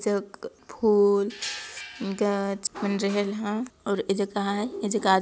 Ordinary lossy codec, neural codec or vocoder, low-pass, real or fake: none; none; none; real